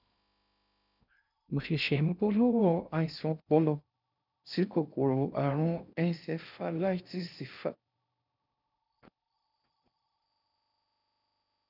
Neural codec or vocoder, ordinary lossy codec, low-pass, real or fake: codec, 16 kHz in and 24 kHz out, 0.6 kbps, FocalCodec, streaming, 2048 codes; none; 5.4 kHz; fake